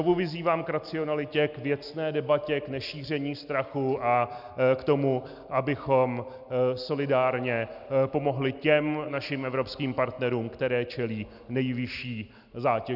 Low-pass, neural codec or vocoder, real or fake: 5.4 kHz; none; real